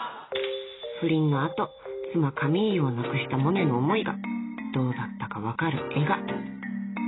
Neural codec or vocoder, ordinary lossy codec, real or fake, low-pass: none; AAC, 16 kbps; real; 7.2 kHz